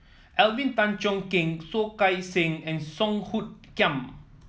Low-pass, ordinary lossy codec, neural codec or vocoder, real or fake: none; none; none; real